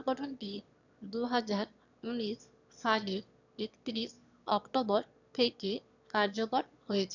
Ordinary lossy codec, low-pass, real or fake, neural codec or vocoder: none; 7.2 kHz; fake; autoencoder, 22.05 kHz, a latent of 192 numbers a frame, VITS, trained on one speaker